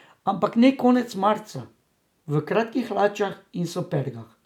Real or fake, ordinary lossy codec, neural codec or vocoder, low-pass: real; none; none; 19.8 kHz